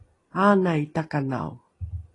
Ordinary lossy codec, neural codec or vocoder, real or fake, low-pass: AAC, 32 kbps; none; real; 10.8 kHz